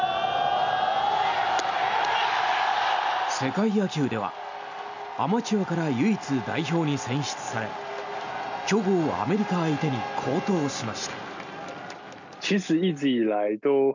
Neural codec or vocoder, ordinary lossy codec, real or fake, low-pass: none; none; real; 7.2 kHz